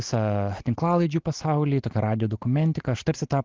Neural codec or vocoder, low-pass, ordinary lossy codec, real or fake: none; 7.2 kHz; Opus, 16 kbps; real